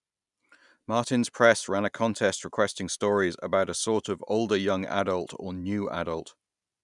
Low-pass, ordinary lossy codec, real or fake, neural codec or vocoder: 10.8 kHz; none; real; none